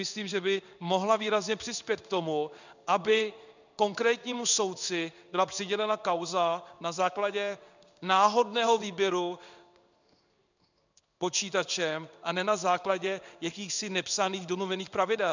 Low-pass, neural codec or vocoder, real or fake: 7.2 kHz; codec, 16 kHz in and 24 kHz out, 1 kbps, XY-Tokenizer; fake